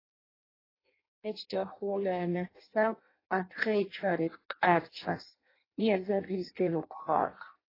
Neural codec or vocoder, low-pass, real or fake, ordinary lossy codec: codec, 16 kHz in and 24 kHz out, 0.6 kbps, FireRedTTS-2 codec; 5.4 kHz; fake; AAC, 24 kbps